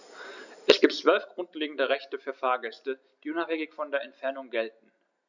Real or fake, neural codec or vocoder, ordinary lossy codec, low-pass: real; none; none; 7.2 kHz